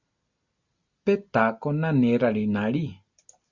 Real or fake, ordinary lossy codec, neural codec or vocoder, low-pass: real; Opus, 64 kbps; none; 7.2 kHz